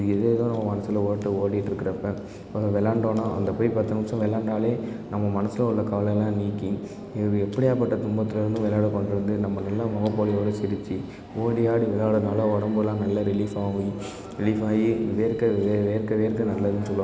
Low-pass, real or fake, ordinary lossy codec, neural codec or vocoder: none; real; none; none